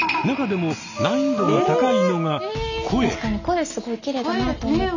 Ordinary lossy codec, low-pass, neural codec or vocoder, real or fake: none; 7.2 kHz; none; real